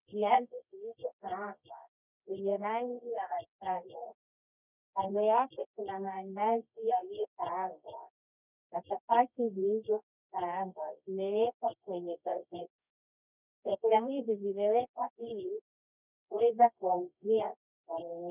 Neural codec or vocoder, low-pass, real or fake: codec, 24 kHz, 0.9 kbps, WavTokenizer, medium music audio release; 3.6 kHz; fake